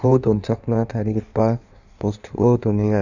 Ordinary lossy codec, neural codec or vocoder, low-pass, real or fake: none; codec, 16 kHz in and 24 kHz out, 1.1 kbps, FireRedTTS-2 codec; 7.2 kHz; fake